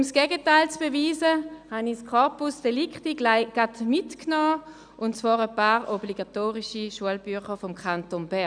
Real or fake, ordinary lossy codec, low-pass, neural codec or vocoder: real; none; 9.9 kHz; none